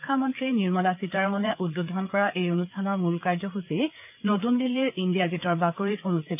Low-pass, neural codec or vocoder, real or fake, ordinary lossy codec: 3.6 kHz; codec, 16 kHz, 4 kbps, FreqCodec, larger model; fake; AAC, 32 kbps